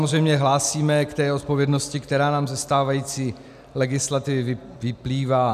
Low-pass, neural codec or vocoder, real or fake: 14.4 kHz; none; real